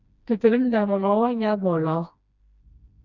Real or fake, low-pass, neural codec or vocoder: fake; 7.2 kHz; codec, 16 kHz, 1 kbps, FreqCodec, smaller model